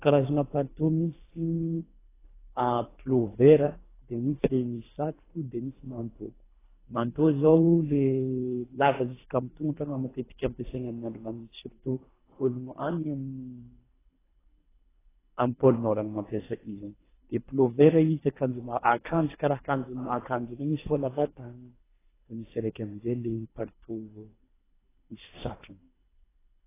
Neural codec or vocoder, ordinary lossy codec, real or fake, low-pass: codec, 24 kHz, 3 kbps, HILCodec; AAC, 16 kbps; fake; 3.6 kHz